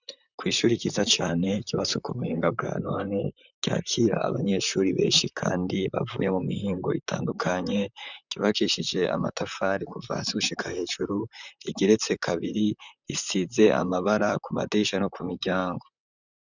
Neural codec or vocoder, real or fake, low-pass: vocoder, 44.1 kHz, 128 mel bands, Pupu-Vocoder; fake; 7.2 kHz